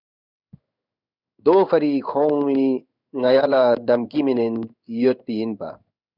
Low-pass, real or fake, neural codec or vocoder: 5.4 kHz; fake; codec, 16 kHz in and 24 kHz out, 1 kbps, XY-Tokenizer